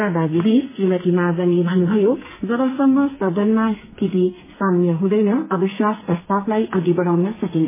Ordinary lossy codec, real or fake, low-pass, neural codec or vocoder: MP3, 16 kbps; fake; 3.6 kHz; codec, 44.1 kHz, 2.6 kbps, SNAC